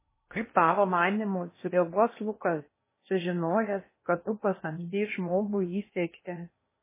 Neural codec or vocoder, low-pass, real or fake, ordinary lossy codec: codec, 16 kHz in and 24 kHz out, 0.8 kbps, FocalCodec, streaming, 65536 codes; 3.6 kHz; fake; MP3, 16 kbps